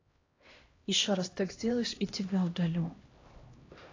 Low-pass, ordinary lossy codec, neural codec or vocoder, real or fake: 7.2 kHz; AAC, 32 kbps; codec, 16 kHz, 1 kbps, X-Codec, HuBERT features, trained on LibriSpeech; fake